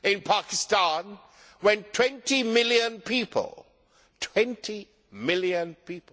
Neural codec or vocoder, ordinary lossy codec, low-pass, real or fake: none; none; none; real